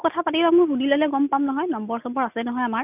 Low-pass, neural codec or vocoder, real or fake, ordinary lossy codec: 3.6 kHz; none; real; none